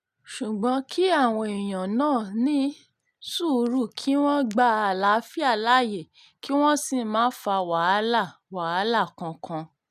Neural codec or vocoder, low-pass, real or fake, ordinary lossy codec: none; 14.4 kHz; real; none